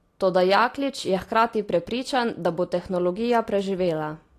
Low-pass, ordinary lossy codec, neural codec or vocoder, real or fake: 14.4 kHz; AAC, 48 kbps; none; real